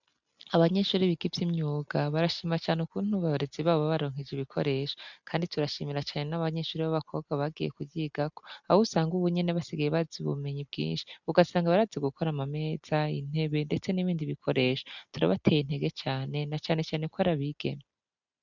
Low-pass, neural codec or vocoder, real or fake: 7.2 kHz; none; real